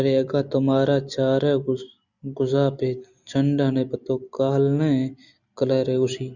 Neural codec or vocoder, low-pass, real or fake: none; 7.2 kHz; real